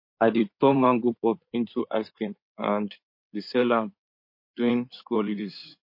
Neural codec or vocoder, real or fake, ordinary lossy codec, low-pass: codec, 16 kHz in and 24 kHz out, 2.2 kbps, FireRedTTS-2 codec; fake; MP3, 32 kbps; 5.4 kHz